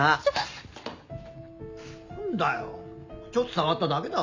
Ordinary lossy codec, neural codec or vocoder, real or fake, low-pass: MP3, 64 kbps; none; real; 7.2 kHz